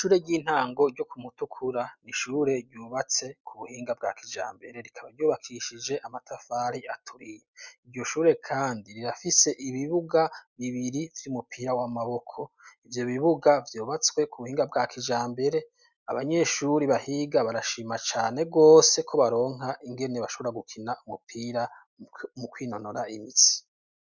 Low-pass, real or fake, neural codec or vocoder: 7.2 kHz; real; none